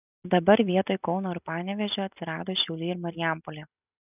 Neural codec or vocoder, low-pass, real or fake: none; 3.6 kHz; real